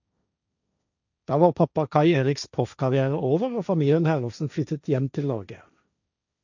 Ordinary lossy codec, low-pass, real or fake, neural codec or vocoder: none; 7.2 kHz; fake; codec, 16 kHz, 1.1 kbps, Voila-Tokenizer